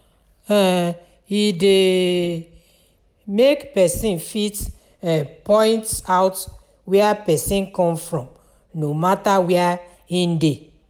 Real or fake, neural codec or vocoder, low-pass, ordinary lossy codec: real; none; none; none